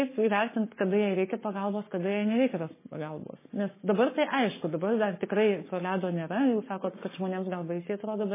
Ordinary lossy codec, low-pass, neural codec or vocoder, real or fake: MP3, 16 kbps; 3.6 kHz; codec, 44.1 kHz, 7.8 kbps, Pupu-Codec; fake